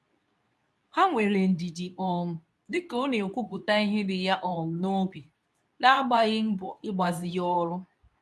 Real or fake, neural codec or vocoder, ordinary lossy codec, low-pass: fake; codec, 24 kHz, 0.9 kbps, WavTokenizer, medium speech release version 2; none; none